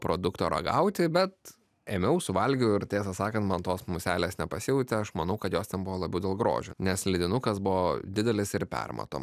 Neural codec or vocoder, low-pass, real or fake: none; 14.4 kHz; real